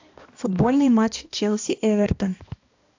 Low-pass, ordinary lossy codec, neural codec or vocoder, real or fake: 7.2 kHz; AAC, 48 kbps; codec, 16 kHz, 1 kbps, X-Codec, HuBERT features, trained on balanced general audio; fake